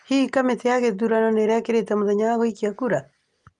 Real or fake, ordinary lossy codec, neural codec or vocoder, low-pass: real; Opus, 32 kbps; none; 10.8 kHz